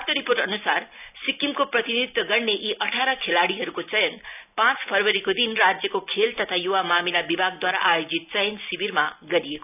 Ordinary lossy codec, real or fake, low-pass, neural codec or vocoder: none; real; 3.6 kHz; none